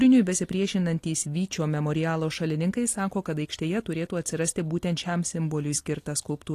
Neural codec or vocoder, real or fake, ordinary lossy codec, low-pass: none; real; AAC, 48 kbps; 14.4 kHz